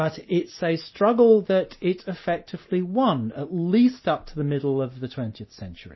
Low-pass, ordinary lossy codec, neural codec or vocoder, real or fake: 7.2 kHz; MP3, 24 kbps; none; real